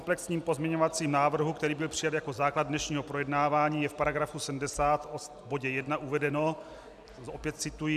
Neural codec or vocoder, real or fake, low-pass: none; real; 14.4 kHz